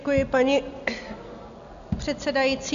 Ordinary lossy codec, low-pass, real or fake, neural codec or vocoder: MP3, 96 kbps; 7.2 kHz; real; none